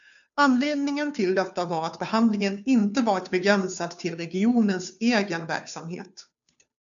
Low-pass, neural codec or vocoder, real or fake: 7.2 kHz; codec, 16 kHz, 2 kbps, FunCodec, trained on Chinese and English, 25 frames a second; fake